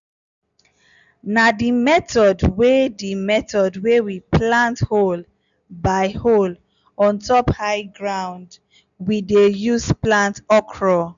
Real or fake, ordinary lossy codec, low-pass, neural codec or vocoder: real; MP3, 96 kbps; 7.2 kHz; none